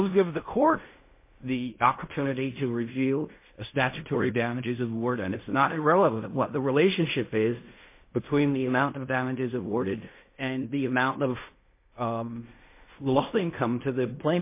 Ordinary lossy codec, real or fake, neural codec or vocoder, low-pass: MP3, 24 kbps; fake; codec, 16 kHz in and 24 kHz out, 0.4 kbps, LongCat-Audio-Codec, fine tuned four codebook decoder; 3.6 kHz